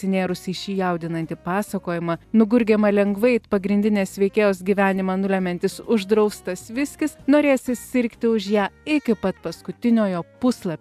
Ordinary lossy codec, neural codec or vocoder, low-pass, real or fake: AAC, 96 kbps; none; 14.4 kHz; real